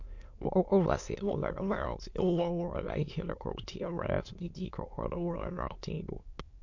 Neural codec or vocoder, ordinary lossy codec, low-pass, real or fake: autoencoder, 22.05 kHz, a latent of 192 numbers a frame, VITS, trained on many speakers; MP3, 48 kbps; 7.2 kHz; fake